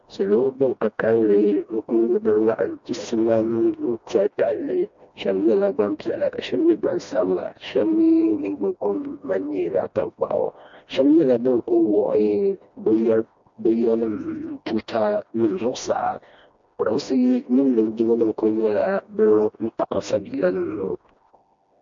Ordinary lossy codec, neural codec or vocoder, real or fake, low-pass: MP3, 48 kbps; codec, 16 kHz, 1 kbps, FreqCodec, smaller model; fake; 7.2 kHz